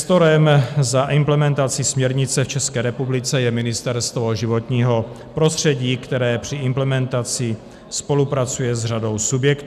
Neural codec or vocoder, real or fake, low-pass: none; real; 14.4 kHz